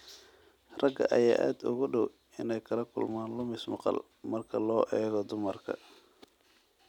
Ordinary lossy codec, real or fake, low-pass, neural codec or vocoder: none; real; 19.8 kHz; none